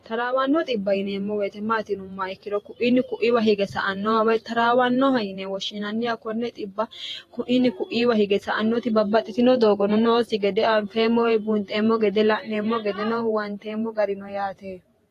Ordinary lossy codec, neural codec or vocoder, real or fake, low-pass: AAC, 48 kbps; vocoder, 48 kHz, 128 mel bands, Vocos; fake; 14.4 kHz